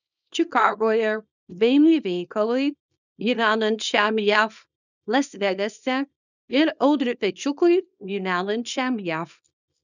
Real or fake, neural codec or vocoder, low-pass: fake; codec, 24 kHz, 0.9 kbps, WavTokenizer, small release; 7.2 kHz